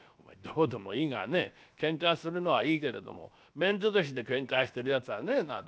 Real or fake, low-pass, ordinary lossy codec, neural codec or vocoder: fake; none; none; codec, 16 kHz, 0.7 kbps, FocalCodec